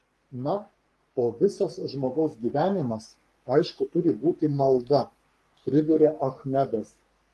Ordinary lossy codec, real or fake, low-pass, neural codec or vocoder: Opus, 32 kbps; fake; 14.4 kHz; codec, 44.1 kHz, 3.4 kbps, Pupu-Codec